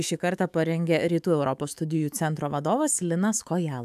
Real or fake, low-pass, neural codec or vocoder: fake; 14.4 kHz; autoencoder, 48 kHz, 128 numbers a frame, DAC-VAE, trained on Japanese speech